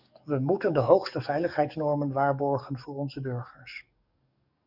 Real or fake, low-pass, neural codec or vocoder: fake; 5.4 kHz; codec, 44.1 kHz, 7.8 kbps, DAC